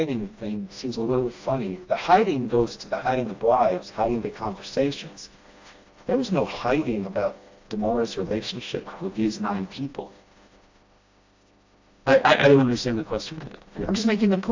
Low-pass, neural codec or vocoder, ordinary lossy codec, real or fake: 7.2 kHz; codec, 16 kHz, 1 kbps, FreqCodec, smaller model; AAC, 48 kbps; fake